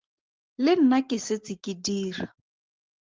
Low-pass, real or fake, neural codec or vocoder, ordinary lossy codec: 7.2 kHz; real; none; Opus, 16 kbps